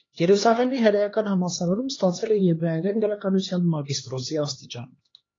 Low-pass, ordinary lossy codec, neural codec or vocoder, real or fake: 7.2 kHz; AAC, 32 kbps; codec, 16 kHz, 2 kbps, X-Codec, HuBERT features, trained on LibriSpeech; fake